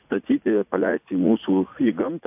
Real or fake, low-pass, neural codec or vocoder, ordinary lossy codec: fake; 3.6 kHz; vocoder, 44.1 kHz, 80 mel bands, Vocos; AAC, 32 kbps